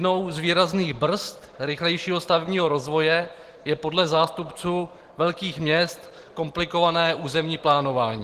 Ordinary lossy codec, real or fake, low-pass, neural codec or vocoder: Opus, 16 kbps; real; 14.4 kHz; none